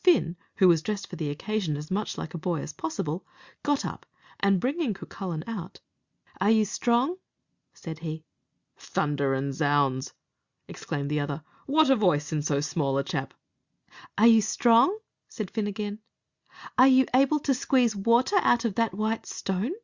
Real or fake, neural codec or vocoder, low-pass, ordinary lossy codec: real; none; 7.2 kHz; Opus, 64 kbps